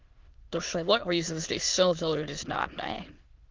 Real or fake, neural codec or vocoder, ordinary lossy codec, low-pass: fake; autoencoder, 22.05 kHz, a latent of 192 numbers a frame, VITS, trained on many speakers; Opus, 32 kbps; 7.2 kHz